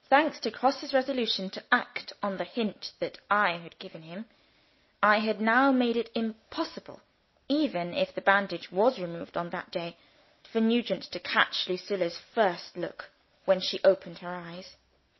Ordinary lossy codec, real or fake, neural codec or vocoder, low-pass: MP3, 24 kbps; real; none; 7.2 kHz